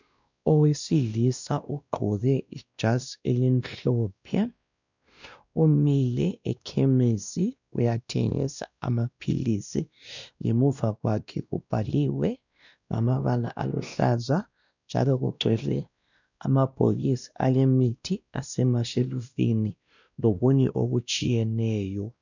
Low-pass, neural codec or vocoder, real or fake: 7.2 kHz; codec, 16 kHz, 1 kbps, X-Codec, WavLM features, trained on Multilingual LibriSpeech; fake